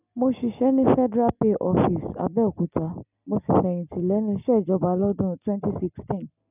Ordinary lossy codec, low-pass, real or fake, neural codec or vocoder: none; 3.6 kHz; real; none